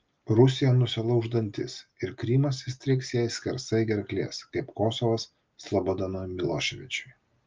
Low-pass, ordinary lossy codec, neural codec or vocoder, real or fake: 7.2 kHz; Opus, 24 kbps; none; real